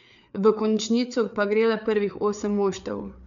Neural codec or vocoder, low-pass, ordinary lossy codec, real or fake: codec, 16 kHz, 4 kbps, FreqCodec, larger model; 7.2 kHz; none; fake